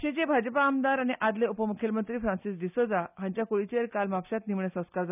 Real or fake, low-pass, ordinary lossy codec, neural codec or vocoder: real; 3.6 kHz; none; none